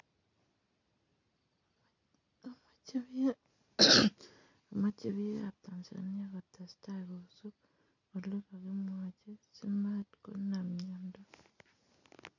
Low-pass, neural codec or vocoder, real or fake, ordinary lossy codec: 7.2 kHz; none; real; none